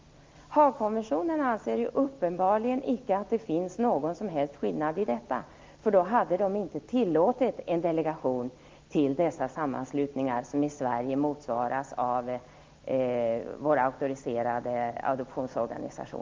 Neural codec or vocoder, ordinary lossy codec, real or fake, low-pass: none; Opus, 32 kbps; real; 7.2 kHz